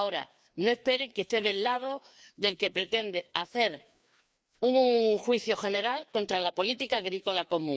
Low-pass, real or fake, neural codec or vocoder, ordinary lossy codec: none; fake; codec, 16 kHz, 2 kbps, FreqCodec, larger model; none